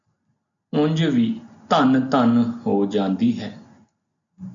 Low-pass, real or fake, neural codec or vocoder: 7.2 kHz; real; none